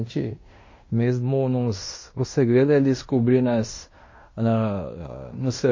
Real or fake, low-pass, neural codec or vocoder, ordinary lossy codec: fake; 7.2 kHz; codec, 16 kHz in and 24 kHz out, 0.9 kbps, LongCat-Audio-Codec, fine tuned four codebook decoder; MP3, 32 kbps